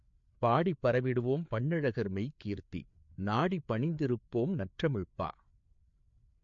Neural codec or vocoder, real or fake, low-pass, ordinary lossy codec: codec, 16 kHz, 4 kbps, FreqCodec, larger model; fake; 7.2 kHz; MP3, 48 kbps